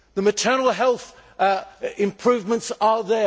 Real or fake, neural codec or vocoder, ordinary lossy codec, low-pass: real; none; none; none